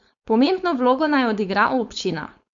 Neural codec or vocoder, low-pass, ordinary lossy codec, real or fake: codec, 16 kHz, 4.8 kbps, FACodec; 7.2 kHz; Opus, 64 kbps; fake